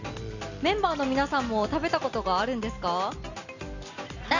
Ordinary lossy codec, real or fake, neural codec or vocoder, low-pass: none; real; none; 7.2 kHz